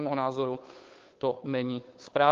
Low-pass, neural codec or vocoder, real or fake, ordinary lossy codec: 7.2 kHz; codec, 16 kHz, 2 kbps, FunCodec, trained on Chinese and English, 25 frames a second; fake; Opus, 32 kbps